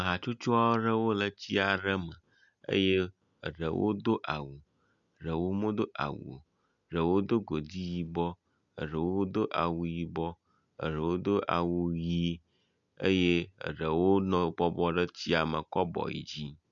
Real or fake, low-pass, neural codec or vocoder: real; 7.2 kHz; none